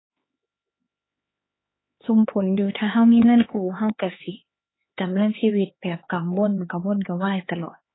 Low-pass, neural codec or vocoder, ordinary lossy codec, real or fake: 7.2 kHz; codec, 16 kHz, 4 kbps, X-Codec, HuBERT features, trained on LibriSpeech; AAC, 16 kbps; fake